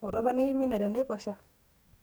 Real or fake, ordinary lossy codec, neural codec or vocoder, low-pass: fake; none; codec, 44.1 kHz, 2.6 kbps, DAC; none